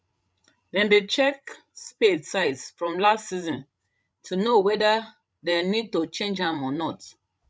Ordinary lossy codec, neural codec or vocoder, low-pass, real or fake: none; codec, 16 kHz, 16 kbps, FreqCodec, larger model; none; fake